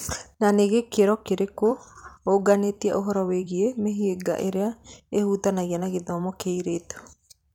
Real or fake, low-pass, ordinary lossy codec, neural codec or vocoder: fake; 19.8 kHz; none; vocoder, 44.1 kHz, 128 mel bands every 256 samples, BigVGAN v2